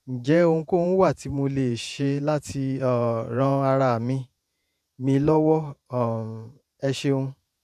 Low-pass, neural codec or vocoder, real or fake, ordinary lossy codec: 14.4 kHz; vocoder, 48 kHz, 128 mel bands, Vocos; fake; none